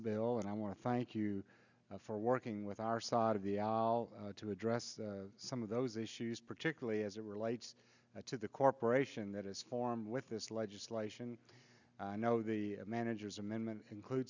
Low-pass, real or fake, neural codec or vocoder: 7.2 kHz; real; none